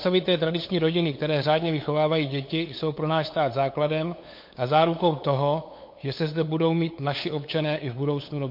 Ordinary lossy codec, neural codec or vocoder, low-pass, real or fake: MP3, 32 kbps; codec, 16 kHz, 8 kbps, FunCodec, trained on LibriTTS, 25 frames a second; 5.4 kHz; fake